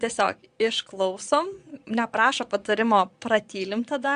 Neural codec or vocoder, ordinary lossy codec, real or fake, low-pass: vocoder, 22.05 kHz, 80 mel bands, Vocos; Opus, 64 kbps; fake; 9.9 kHz